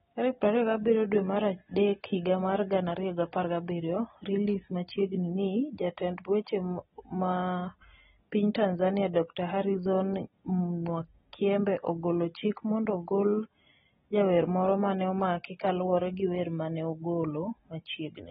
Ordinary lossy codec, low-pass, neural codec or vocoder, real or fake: AAC, 16 kbps; 19.8 kHz; none; real